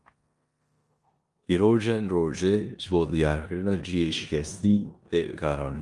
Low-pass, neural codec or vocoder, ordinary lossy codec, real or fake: 10.8 kHz; codec, 16 kHz in and 24 kHz out, 0.9 kbps, LongCat-Audio-Codec, four codebook decoder; Opus, 32 kbps; fake